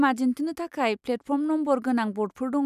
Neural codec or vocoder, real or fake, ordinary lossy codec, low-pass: none; real; none; 14.4 kHz